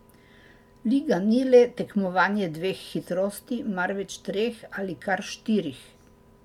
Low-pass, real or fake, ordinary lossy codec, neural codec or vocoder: 19.8 kHz; real; MP3, 96 kbps; none